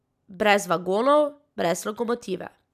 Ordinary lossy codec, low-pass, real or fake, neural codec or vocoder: none; 14.4 kHz; real; none